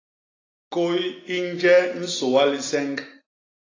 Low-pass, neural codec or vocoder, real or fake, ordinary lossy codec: 7.2 kHz; none; real; AAC, 32 kbps